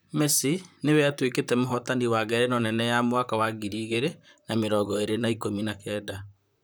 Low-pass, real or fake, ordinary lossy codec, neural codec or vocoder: none; fake; none; vocoder, 44.1 kHz, 128 mel bands, Pupu-Vocoder